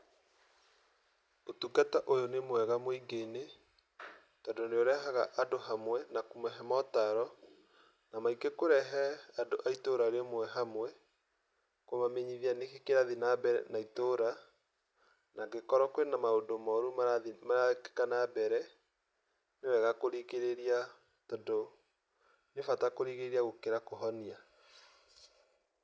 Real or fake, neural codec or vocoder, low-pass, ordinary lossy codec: real; none; none; none